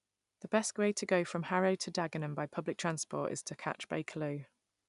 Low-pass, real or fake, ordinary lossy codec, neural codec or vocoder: 10.8 kHz; real; none; none